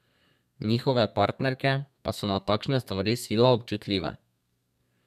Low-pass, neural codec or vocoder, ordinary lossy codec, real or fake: 14.4 kHz; codec, 32 kHz, 1.9 kbps, SNAC; none; fake